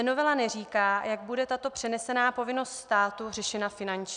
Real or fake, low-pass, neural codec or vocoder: real; 9.9 kHz; none